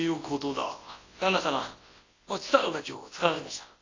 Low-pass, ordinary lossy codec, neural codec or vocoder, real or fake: 7.2 kHz; AAC, 32 kbps; codec, 24 kHz, 0.9 kbps, WavTokenizer, large speech release; fake